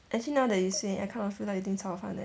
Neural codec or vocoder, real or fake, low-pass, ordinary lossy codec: none; real; none; none